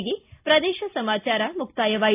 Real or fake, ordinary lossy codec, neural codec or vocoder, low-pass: real; AAC, 24 kbps; none; 3.6 kHz